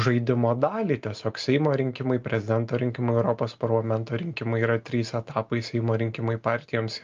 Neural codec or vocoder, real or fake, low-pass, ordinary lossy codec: none; real; 7.2 kHz; Opus, 24 kbps